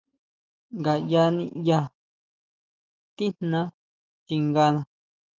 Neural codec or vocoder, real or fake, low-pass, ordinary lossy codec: none; real; 7.2 kHz; Opus, 24 kbps